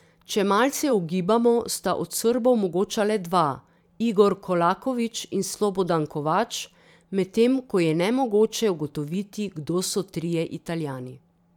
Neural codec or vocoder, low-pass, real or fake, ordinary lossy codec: vocoder, 44.1 kHz, 128 mel bands every 512 samples, BigVGAN v2; 19.8 kHz; fake; none